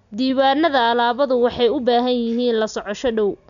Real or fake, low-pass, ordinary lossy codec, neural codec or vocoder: real; 7.2 kHz; none; none